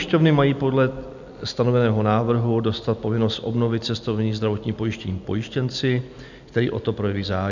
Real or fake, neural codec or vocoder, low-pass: real; none; 7.2 kHz